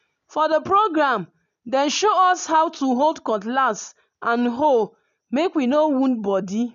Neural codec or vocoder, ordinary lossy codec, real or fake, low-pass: none; MP3, 64 kbps; real; 7.2 kHz